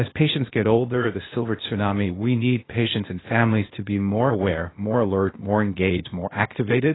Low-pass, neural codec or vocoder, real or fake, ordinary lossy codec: 7.2 kHz; codec, 16 kHz, 0.8 kbps, ZipCodec; fake; AAC, 16 kbps